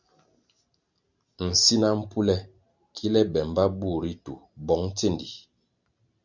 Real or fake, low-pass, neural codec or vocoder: real; 7.2 kHz; none